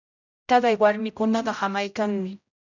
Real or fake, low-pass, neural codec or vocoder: fake; 7.2 kHz; codec, 16 kHz, 0.5 kbps, X-Codec, HuBERT features, trained on general audio